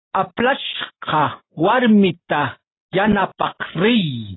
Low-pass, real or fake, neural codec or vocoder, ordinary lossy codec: 7.2 kHz; real; none; AAC, 16 kbps